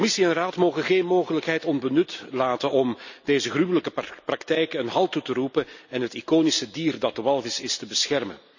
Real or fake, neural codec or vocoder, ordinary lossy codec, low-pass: real; none; none; 7.2 kHz